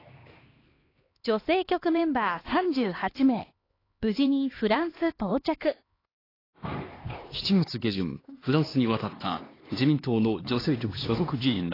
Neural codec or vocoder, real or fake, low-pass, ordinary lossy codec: codec, 16 kHz, 2 kbps, X-Codec, HuBERT features, trained on LibriSpeech; fake; 5.4 kHz; AAC, 24 kbps